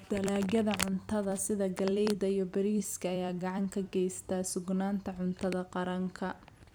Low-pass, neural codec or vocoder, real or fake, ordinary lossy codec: none; vocoder, 44.1 kHz, 128 mel bands every 256 samples, BigVGAN v2; fake; none